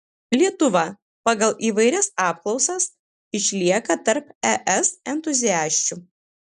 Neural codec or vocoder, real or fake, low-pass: none; real; 10.8 kHz